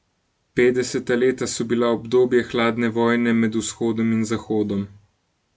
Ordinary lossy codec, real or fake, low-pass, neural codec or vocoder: none; real; none; none